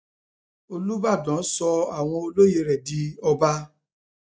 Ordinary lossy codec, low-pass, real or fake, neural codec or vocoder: none; none; real; none